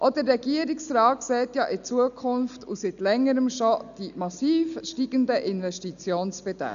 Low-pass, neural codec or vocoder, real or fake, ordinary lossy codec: 7.2 kHz; none; real; none